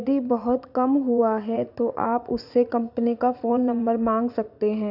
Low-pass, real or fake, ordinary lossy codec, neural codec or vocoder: 5.4 kHz; fake; none; vocoder, 44.1 kHz, 80 mel bands, Vocos